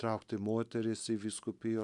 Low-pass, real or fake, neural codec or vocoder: 10.8 kHz; real; none